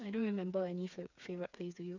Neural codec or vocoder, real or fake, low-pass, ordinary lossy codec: codec, 16 kHz, 4 kbps, FreqCodec, smaller model; fake; 7.2 kHz; none